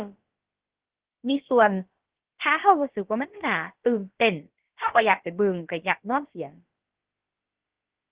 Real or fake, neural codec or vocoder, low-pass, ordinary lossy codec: fake; codec, 16 kHz, about 1 kbps, DyCAST, with the encoder's durations; 3.6 kHz; Opus, 16 kbps